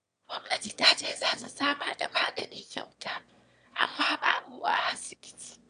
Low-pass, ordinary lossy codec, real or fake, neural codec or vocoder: 9.9 kHz; MP3, 96 kbps; fake; autoencoder, 22.05 kHz, a latent of 192 numbers a frame, VITS, trained on one speaker